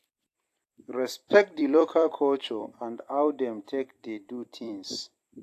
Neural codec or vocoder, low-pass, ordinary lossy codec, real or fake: vocoder, 44.1 kHz, 128 mel bands every 256 samples, BigVGAN v2; 14.4 kHz; AAC, 64 kbps; fake